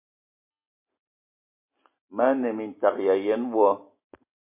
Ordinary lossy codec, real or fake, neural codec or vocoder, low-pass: MP3, 24 kbps; real; none; 3.6 kHz